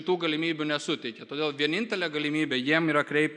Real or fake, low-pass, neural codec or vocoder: real; 10.8 kHz; none